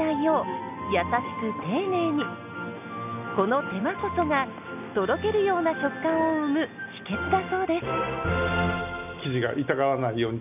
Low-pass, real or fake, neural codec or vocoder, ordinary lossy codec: 3.6 kHz; real; none; none